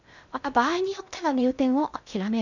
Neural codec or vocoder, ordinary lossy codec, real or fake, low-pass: codec, 16 kHz in and 24 kHz out, 0.6 kbps, FocalCodec, streaming, 2048 codes; none; fake; 7.2 kHz